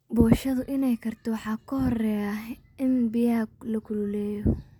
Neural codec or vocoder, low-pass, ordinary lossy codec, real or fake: none; 19.8 kHz; none; real